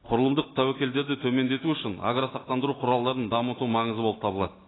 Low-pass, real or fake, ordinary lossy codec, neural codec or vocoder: 7.2 kHz; real; AAC, 16 kbps; none